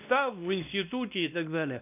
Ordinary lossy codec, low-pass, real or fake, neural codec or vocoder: none; 3.6 kHz; fake; codec, 16 kHz, 1 kbps, X-Codec, WavLM features, trained on Multilingual LibriSpeech